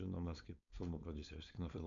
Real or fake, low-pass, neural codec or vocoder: fake; 7.2 kHz; codec, 16 kHz, 4.8 kbps, FACodec